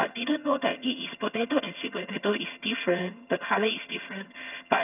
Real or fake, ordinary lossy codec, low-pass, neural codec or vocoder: fake; none; 3.6 kHz; vocoder, 22.05 kHz, 80 mel bands, HiFi-GAN